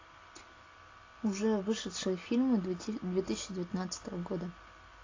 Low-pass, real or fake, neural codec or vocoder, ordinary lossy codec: 7.2 kHz; real; none; AAC, 32 kbps